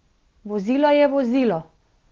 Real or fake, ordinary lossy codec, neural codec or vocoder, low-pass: real; Opus, 16 kbps; none; 7.2 kHz